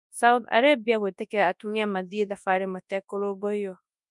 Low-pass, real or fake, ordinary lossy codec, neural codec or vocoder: 10.8 kHz; fake; none; codec, 24 kHz, 0.9 kbps, WavTokenizer, large speech release